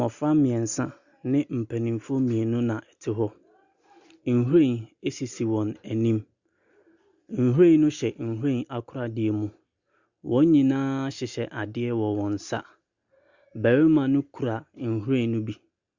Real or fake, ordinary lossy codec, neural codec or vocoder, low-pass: real; Opus, 64 kbps; none; 7.2 kHz